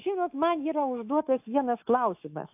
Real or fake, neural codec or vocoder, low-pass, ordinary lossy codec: fake; autoencoder, 48 kHz, 128 numbers a frame, DAC-VAE, trained on Japanese speech; 3.6 kHz; AAC, 32 kbps